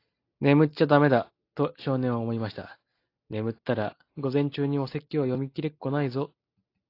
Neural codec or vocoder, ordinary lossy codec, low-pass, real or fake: none; AAC, 32 kbps; 5.4 kHz; real